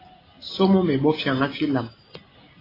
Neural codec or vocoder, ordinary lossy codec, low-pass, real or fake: none; AAC, 24 kbps; 5.4 kHz; real